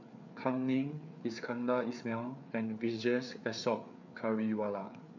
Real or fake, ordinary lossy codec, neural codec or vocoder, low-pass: fake; none; codec, 16 kHz, 4 kbps, FreqCodec, larger model; 7.2 kHz